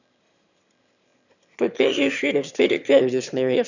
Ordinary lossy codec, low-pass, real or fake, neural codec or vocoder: none; 7.2 kHz; fake; autoencoder, 22.05 kHz, a latent of 192 numbers a frame, VITS, trained on one speaker